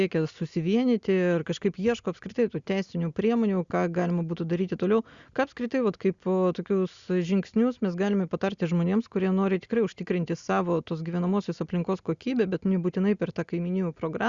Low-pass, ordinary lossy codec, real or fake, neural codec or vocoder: 7.2 kHz; Opus, 64 kbps; real; none